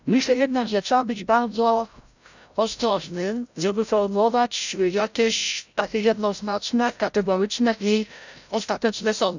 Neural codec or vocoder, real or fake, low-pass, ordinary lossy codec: codec, 16 kHz, 0.5 kbps, FreqCodec, larger model; fake; 7.2 kHz; none